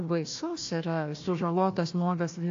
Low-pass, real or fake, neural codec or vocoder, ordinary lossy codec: 7.2 kHz; fake; codec, 16 kHz, 1 kbps, FunCodec, trained on Chinese and English, 50 frames a second; AAC, 48 kbps